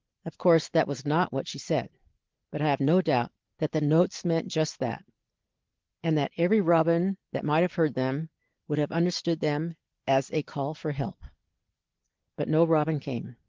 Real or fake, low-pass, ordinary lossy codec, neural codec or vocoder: real; 7.2 kHz; Opus, 16 kbps; none